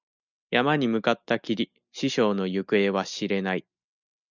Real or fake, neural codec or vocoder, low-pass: real; none; 7.2 kHz